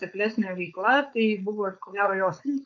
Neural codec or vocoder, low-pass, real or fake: codec, 16 kHz, 4.8 kbps, FACodec; 7.2 kHz; fake